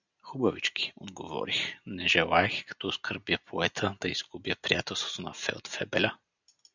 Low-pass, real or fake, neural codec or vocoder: 7.2 kHz; real; none